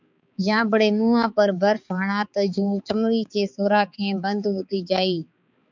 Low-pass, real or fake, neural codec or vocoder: 7.2 kHz; fake; codec, 16 kHz, 4 kbps, X-Codec, HuBERT features, trained on balanced general audio